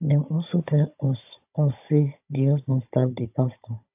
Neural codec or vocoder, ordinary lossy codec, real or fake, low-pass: codec, 16 kHz, 16 kbps, FunCodec, trained on Chinese and English, 50 frames a second; AAC, 32 kbps; fake; 3.6 kHz